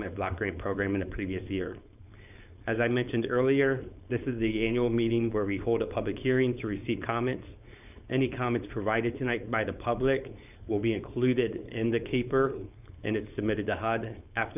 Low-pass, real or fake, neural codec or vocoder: 3.6 kHz; fake; codec, 16 kHz, 4.8 kbps, FACodec